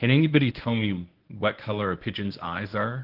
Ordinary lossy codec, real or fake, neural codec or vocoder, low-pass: Opus, 16 kbps; fake; codec, 24 kHz, 0.9 kbps, WavTokenizer, medium speech release version 1; 5.4 kHz